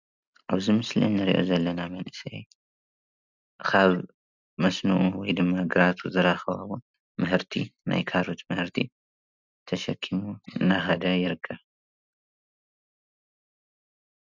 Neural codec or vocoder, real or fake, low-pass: none; real; 7.2 kHz